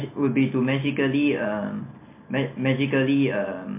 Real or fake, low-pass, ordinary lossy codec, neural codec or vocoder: real; 3.6 kHz; MP3, 24 kbps; none